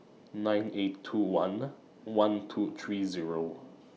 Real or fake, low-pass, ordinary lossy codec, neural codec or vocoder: real; none; none; none